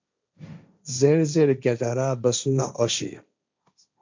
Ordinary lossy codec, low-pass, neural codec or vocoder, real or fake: MP3, 64 kbps; 7.2 kHz; codec, 16 kHz, 1.1 kbps, Voila-Tokenizer; fake